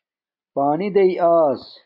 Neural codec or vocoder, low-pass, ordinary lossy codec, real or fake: none; 5.4 kHz; MP3, 32 kbps; real